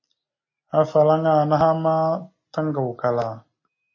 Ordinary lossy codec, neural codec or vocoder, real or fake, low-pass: MP3, 32 kbps; none; real; 7.2 kHz